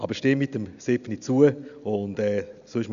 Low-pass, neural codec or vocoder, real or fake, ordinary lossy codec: 7.2 kHz; none; real; none